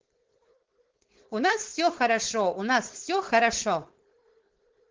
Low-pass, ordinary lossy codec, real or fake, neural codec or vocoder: 7.2 kHz; Opus, 16 kbps; fake; codec, 16 kHz, 4.8 kbps, FACodec